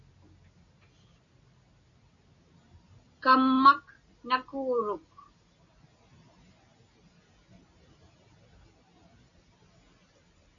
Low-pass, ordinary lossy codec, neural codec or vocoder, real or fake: 7.2 kHz; MP3, 96 kbps; none; real